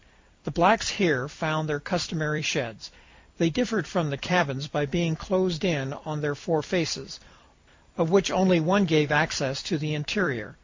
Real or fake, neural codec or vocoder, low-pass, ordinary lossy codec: fake; vocoder, 44.1 kHz, 128 mel bands every 256 samples, BigVGAN v2; 7.2 kHz; MP3, 48 kbps